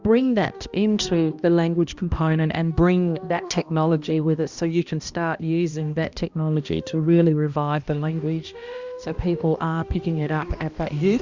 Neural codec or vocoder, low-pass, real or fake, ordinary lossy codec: codec, 16 kHz, 1 kbps, X-Codec, HuBERT features, trained on balanced general audio; 7.2 kHz; fake; Opus, 64 kbps